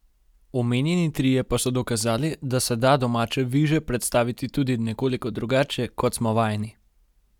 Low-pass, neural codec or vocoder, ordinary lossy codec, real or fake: 19.8 kHz; none; none; real